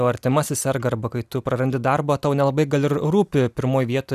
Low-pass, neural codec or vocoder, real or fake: 14.4 kHz; none; real